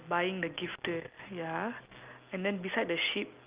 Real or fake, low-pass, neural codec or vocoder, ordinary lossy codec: real; 3.6 kHz; none; Opus, 24 kbps